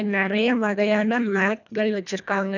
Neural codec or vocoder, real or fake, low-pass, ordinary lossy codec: codec, 24 kHz, 1.5 kbps, HILCodec; fake; 7.2 kHz; none